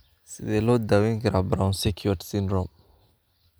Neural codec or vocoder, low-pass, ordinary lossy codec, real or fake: none; none; none; real